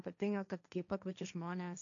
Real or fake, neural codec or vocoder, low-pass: fake; codec, 16 kHz, 1.1 kbps, Voila-Tokenizer; 7.2 kHz